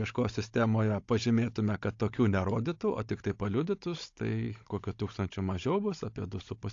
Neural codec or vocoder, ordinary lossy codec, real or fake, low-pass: codec, 16 kHz, 16 kbps, FunCodec, trained on Chinese and English, 50 frames a second; AAC, 48 kbps; fake; 7.2 kHz